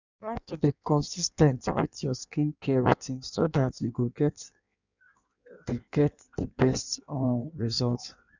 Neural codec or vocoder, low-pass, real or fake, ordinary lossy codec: codec, 16 kHz in and 24 kHz out, 1.1 kbps, FireRedTTS-2 codec; 7.2 kHz; fake; none